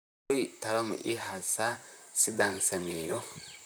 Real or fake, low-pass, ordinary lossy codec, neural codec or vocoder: fake; none; none; vocoder, 44.1 kHz, 128 mel bands, Pupu-Vocoder